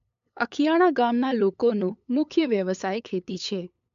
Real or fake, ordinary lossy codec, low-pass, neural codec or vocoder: fake; MP3, 64 kbps; 7.2 kHz; codec, 16 kHz, 8 kbps, FunCodec, trained on LibriTTS, 25 frames a second